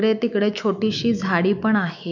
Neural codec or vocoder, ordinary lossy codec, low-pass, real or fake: autoencoder, 48 kHz, 128 numbers a frame, DAC-VAE, trained on Japanese speech; none; 7.2 kHz; fake